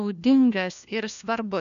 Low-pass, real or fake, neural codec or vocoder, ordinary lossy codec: 7.2 kHz; fake; codec, 16 kHz, 1 kbps, FunCodec, trained on LibriTTS, 50 frames a second; MP3, 96 kbps